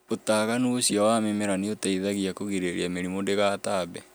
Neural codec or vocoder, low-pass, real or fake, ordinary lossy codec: none; none; real; none